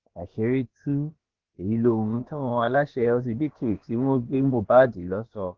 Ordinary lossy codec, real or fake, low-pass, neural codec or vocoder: Opus, 16 kbps; fake; 7.2 kHz; codec, 16 kHz, about 1 kbps, DyCAST, with the encoder's durations